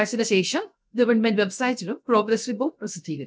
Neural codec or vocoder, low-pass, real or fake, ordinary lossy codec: codec, 16 kHz, 0.7 kbps, FocalCodec; none; fake; none